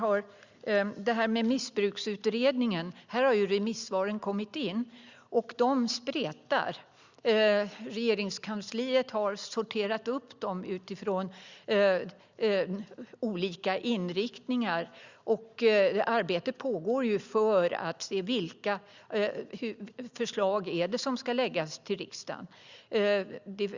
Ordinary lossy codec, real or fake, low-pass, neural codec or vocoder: Opus, 64 kbps; real; 7.2 kHz; none